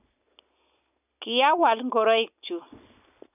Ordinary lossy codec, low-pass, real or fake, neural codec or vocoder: none; 3.6 kHz; real; none